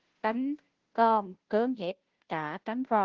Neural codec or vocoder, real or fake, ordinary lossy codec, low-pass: codec, 16 kHz, 0.5 kbps, FunCodec, trained on Chinese and English, 25 frames a second; fake; Opus, 16 kbps; 7.2 kHz